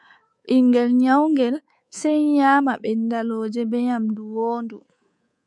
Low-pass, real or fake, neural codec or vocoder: 10.8 kHz; fake; codec, 24 kHz, 3.1 kbps, DualCodec